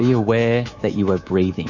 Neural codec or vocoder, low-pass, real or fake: codec, 24 kHz, 3.1 kbps, DualCodec; 7.2 kHz; fake